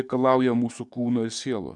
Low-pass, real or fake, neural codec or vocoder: 10.8 kHz; fake; codec, 44.1 kHz, 7.8 kbps, DAC